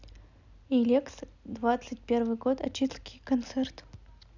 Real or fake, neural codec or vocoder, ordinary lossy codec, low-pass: real; none; none; 7.2 kHz